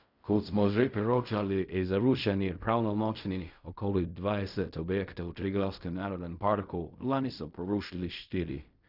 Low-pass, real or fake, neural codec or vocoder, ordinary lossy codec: 5.4 kHz; fake; codec, 16 kHz in and 24 kHz out, 0.4 kbps, LongCat-Audio-Codec, fine tuned four codebook decoder; none